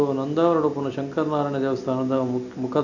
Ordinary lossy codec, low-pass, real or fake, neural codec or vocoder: none; 7.2 kHz; real; none